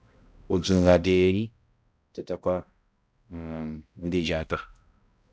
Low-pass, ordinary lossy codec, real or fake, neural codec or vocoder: none; none; fake; codec, 16 kHz, 0.5 kbps, X-Codec, HuBERT features, trained on balanced general audio